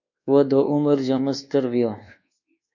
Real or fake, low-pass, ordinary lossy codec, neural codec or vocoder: fake; 7.2 kHz; AAC, 48 kbps; codec, 24 kHz, 1.2 kbps, DualCodec